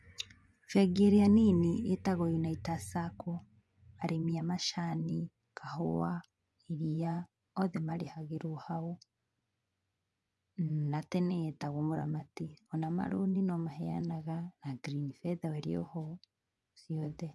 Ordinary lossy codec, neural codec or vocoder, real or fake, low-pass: none; vocoder, 24 kHz, 100 mel bands, Vocos; fake; none